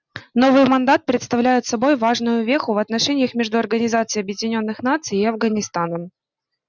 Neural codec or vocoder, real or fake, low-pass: none; real; 7.2 kHz